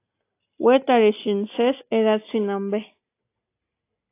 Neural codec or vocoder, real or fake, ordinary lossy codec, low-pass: none; real; AAC, 24 kbps; 3.6 kHz